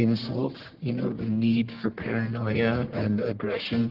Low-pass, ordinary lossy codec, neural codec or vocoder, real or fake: 5.4 kHz; Opus, 16 kbps; codec, 44.1 kHz, 1.7 kbps, Pupu-Codec; fake